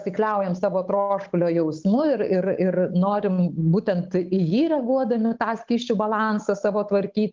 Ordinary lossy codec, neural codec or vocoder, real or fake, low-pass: Opus, 32 kbps; codec, 16 kHz, 8 kbps, FunCodec, trained on Chinese and English, 25 frames a second; fake; 7.2 kHz